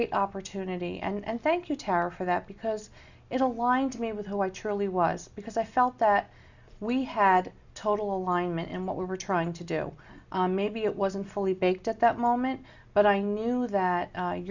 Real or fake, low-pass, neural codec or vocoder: real; 7.2 kHz; none